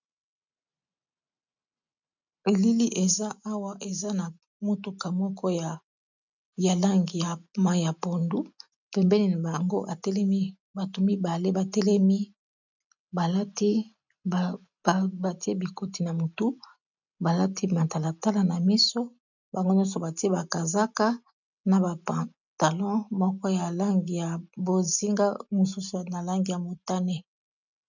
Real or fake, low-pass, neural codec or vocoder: real; 7.2 kHz; none